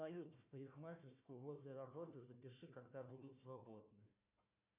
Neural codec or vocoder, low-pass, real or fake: codec, 16 kHz, 1 kbps, FunCodec, trained on Chinese and English, 50 frames a second; 3.6 kHz; fake